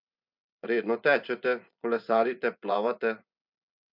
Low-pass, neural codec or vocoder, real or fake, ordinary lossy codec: 5.4 kHz; vocoder, 24 kHz, 100 mel bands, Vocos; fake; none